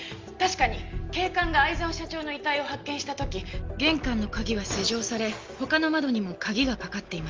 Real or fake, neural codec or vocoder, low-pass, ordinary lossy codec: real; none; 7.2 kHz; Opus, 32 kbps